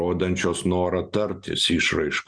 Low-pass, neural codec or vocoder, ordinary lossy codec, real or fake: 9.9 kHz; none; Opus, 64 kbps; real